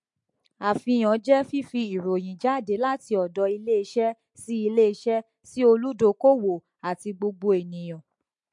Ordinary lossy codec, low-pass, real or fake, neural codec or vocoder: MP3, 48 kbps; 10.8 kHz; real; none